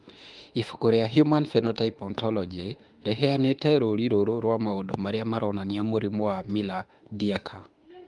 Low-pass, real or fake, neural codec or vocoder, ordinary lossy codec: 10.8 kHz; fake; autoencoder, 48 kHz, 32 numbers a frame, DAC-VAE, trained on Japanese speech; Opus, 32 kbps